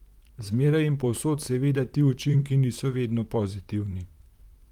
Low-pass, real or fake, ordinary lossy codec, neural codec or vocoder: 19.8 kHz; fake; Opus, 32 kbps; vocoder, 44.1 kHz, 128 mel bands, Pupu-Vocoder